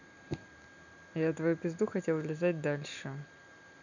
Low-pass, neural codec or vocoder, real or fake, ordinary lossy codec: 7.2 kHz; none; real; none